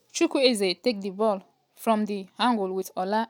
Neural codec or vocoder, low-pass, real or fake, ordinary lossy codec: none; 19.8 kHz; real; none